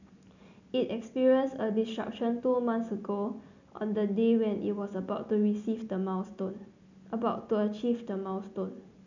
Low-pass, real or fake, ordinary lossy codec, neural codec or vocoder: 7.2 kHz; real; none; none